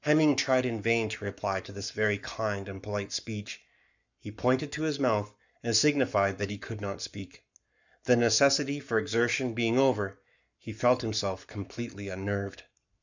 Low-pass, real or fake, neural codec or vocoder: 7.2 kHz; fake; codec, 44.1 kHz, 7.8 kbps, Pupu-Codec